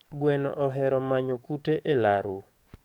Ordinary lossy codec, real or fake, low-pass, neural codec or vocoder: none; fake; 19.8 kHz; codec, 44.1 kHz, 7.8 kbps, DAC